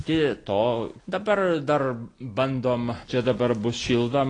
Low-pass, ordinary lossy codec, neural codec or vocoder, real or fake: 9.9 kHz; AAC, 32 kbps; none; real